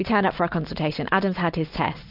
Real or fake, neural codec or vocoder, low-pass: real; none; 5.4 kHz